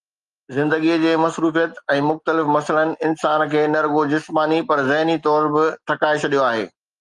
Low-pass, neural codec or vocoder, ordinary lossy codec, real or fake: 10.8 kHz; none; Opus, 32 kbps; real